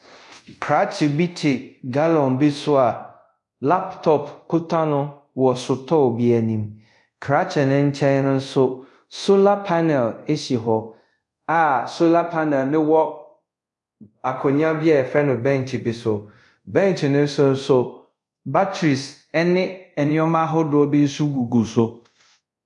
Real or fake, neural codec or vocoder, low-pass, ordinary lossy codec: fake; codec, 24 kHz, 0.5 kbps, DualCodec; 10.8 kHz; MP3, 64 kbps